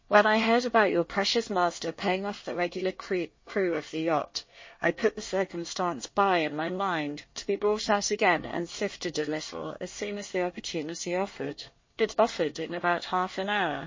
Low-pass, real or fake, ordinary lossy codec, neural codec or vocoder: 7.2 kHz; fake; MP3, 32 kbps; codec, 24 kHz, 1 kbps, SNAC